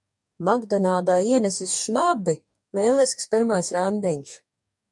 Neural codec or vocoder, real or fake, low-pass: codec, 44.1 kHz, 2.6 kbps, DAC; fake; 10.8 kHz